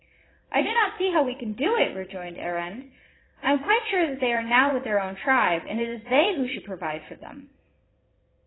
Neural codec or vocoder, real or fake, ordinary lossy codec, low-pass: vocoder, 22.05 kHz, 80 mel bands, Vocos; fake; AAC, 16 kbps; 7.2 kHz